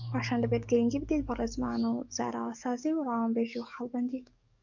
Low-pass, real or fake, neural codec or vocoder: 7.2 kHz; fake; codec, 44.1 kHz, 7.8 kbps, DAC